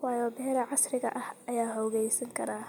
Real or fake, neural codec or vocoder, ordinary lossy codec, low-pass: real; none; none; none